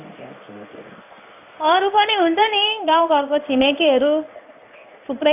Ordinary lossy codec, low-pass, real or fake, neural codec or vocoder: AAC, 32 kbps; 3.6 kHz; fake; codec, 16 kHz in and 24 kHz out, 1 kbps, XY-Tokenizer